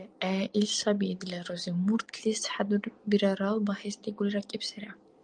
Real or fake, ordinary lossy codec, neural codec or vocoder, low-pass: real; Opus, 32 kbps; none; 9.9 kHz